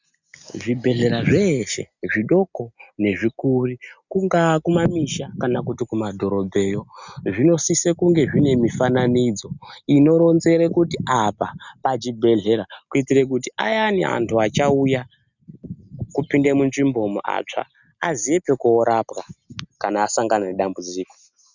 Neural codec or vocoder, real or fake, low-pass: none; real; 7.2 kHz